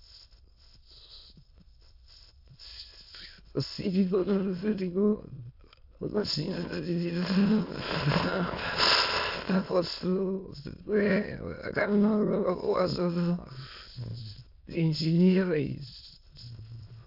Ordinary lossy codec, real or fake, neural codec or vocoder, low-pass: AAC, 48 kbps; fake; autoencoder, 22.05 kHz, a latent of 192 numbers a frame, VITS, trained on many speakers; 5.4 kHz